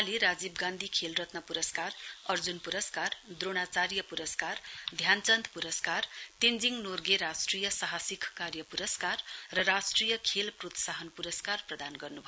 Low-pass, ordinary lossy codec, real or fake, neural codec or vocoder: none; none; real; none